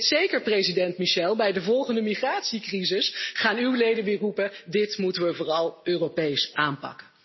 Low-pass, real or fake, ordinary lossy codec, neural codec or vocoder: 7.2 kHz; real; MP3, 24 kbps; none